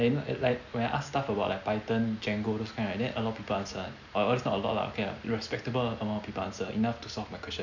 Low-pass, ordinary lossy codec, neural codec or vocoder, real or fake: 7.2 kHz; none; none; real